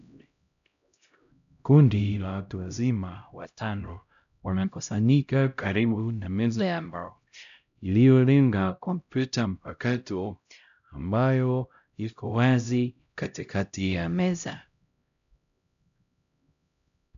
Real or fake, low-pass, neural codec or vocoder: fake; 7.2 kHz; codec, 16 kHz, 0.5 kbps, X-Codec, HuBERT features, trained on LibriSpeech